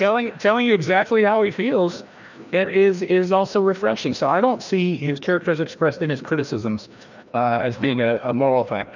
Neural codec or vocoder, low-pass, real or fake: codec, 16 kHz, 1 kbps, FreqCodec, larger model; 7.2 kHz; fake